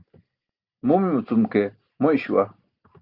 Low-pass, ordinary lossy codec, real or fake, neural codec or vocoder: 5.4 kHz; Opus, 32 kbps; real; none